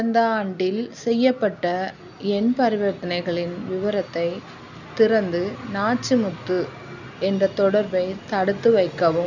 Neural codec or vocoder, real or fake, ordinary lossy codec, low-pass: none; real; none; 7.2 kHz